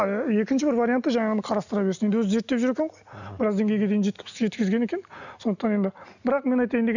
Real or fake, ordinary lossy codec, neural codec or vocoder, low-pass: real; none; none; 7.2 kHz